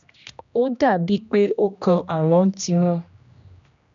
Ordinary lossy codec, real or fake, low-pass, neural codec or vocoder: none; fake; 7.2 kHz; codec, 16 kHz, 1 kbps, X-Codec, HuBERT features, trained on general audio